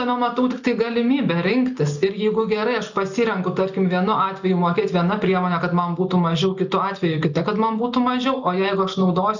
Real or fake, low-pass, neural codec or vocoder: real; 7.2 kHz; none